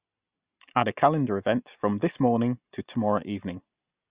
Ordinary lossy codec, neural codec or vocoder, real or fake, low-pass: Opus, 64 kbps; none; real; 3.6 kHz